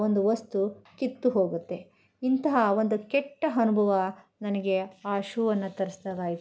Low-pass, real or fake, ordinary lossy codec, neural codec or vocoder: none; real; none; none